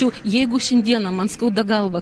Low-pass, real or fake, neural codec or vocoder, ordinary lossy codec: 10.8 kHz; real; none; Opus, 16 kbps